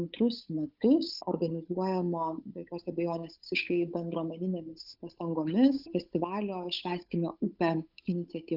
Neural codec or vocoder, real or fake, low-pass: codec, 16 kHz, 8 kbps, FunCodec, trained on Chinese and English, 25 frames a second; fake; 5.4 kHz